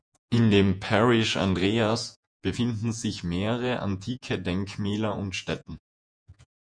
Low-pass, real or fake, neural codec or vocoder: 9.9 kHz; fake; vocoder, 48 kHz, 128 mel bands, Vocos